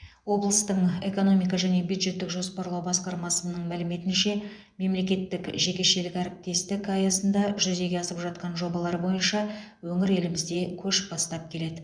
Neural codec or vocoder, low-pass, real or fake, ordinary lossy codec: vocoder, 24 kHz, 100 mel bands, Vocos; 9.9 kHz; fake; none